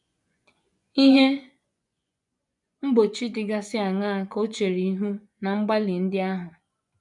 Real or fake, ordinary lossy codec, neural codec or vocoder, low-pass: fake; none; vocoder, 24 kHz, 100 mel bands, Vocos; 10.8 kHz